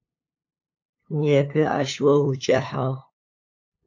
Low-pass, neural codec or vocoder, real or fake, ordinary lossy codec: 7.2 kHz; codec, 16 kHz, 2 kbps, FunCodec, trained on LibriTTS, 25 frames a second; fake; AAC, 48 kbps